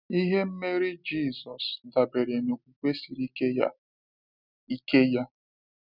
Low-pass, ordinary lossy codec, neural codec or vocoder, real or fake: 5.4 kHz; none; none; real